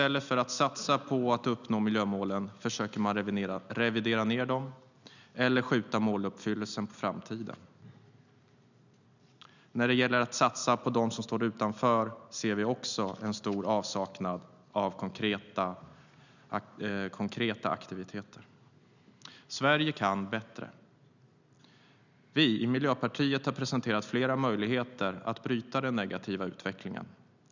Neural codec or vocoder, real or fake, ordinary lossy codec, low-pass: none; real; none; 7.2 kHz